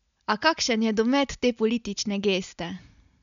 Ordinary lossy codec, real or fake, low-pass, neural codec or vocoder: none; real; 7.2 kHz; none